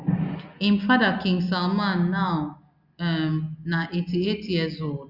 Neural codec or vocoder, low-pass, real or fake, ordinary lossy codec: none; 5.4 kHz; real; Opus, 64 kbps